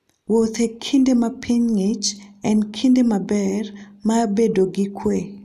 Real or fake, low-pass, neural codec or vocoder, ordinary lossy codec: real; 14.4 kHz; none; none